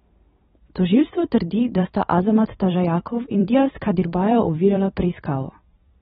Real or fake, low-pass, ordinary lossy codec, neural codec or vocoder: fake; 19.8 kHz; AAC, 16 kbps; vocoder, 44.1 kHz, 128 mel bands every 256 samples, BigVGAN v2